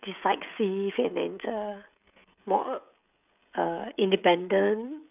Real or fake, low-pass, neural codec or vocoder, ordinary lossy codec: fake; 3.6 kHz; codec, 16 kHz, 16 kbps, FreqCodec, smaller model; none